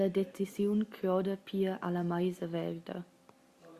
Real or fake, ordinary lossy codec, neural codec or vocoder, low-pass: real; Opus, 64 kbps; none; 14.4 kHz